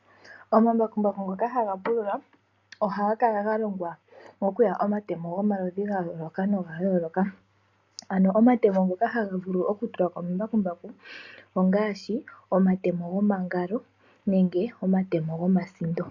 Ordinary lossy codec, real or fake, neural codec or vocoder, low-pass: AAC, 48 kbps; real; none; 7.2 kHz